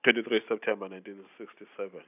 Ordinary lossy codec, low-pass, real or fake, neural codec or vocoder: none; 3.6 kHz; real; none